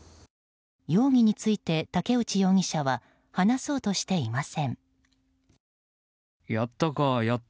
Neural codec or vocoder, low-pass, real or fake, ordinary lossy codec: none; none; real; none